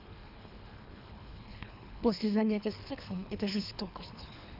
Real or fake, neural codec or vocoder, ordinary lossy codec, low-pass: fake; codec, 24 kHz, 3 kbps, HILCodec; none; 5.4 kHz